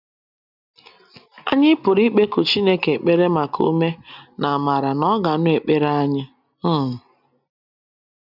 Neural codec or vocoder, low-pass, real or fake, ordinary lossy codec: none; 5.4 kHz; real; none